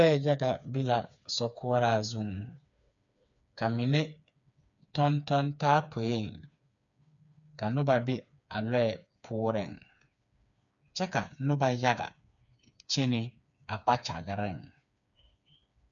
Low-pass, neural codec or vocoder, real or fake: 7.2 kHz; codec, 16 kHz, 4 kbps, FreqCodec, smaller model; fake